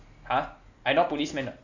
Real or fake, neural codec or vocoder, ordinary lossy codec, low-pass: real; none; none; 7.2 kHz